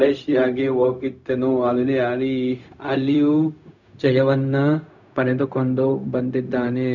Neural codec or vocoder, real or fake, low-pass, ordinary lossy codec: codec, 16 kHz, 0.4 kbps, LongCat-Audio-Codec; fake; 7.2 kHz; none